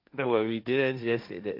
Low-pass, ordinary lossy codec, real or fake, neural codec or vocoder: 5.4 kHz; MP3, 48 kbps; fake; codec, 16 kHz, 1.1 kbps, Voila-Tokenizer